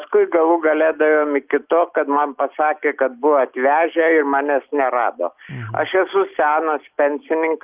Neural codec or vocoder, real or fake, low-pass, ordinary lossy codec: none; real; 3.6 kHz; Opus, 24 kbps